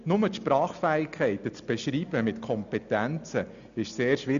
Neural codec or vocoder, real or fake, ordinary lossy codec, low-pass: none; real; none; 7.2 kHz